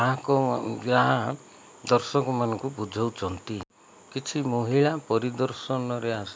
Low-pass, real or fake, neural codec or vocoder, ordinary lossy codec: none; real; none; none